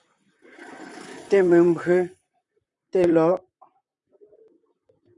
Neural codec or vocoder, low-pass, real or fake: vocoder, 44.1 kHz, 128 mel bands, Pupu-Vocoder; 10.8 kHz; fake